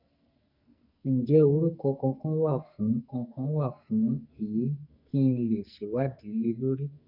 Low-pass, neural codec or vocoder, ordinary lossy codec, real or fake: 5.4 kHz; codec, 44.1 kHz, 2.6 kbps, SNAC; none; fake